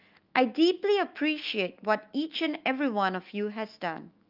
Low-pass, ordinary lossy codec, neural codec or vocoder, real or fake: 5.4 kHz; Opus, 32 kbps; autoencoder, 48 kHz, 128 numbers a frame, DAC-VAE, trained on Japanese speech; fake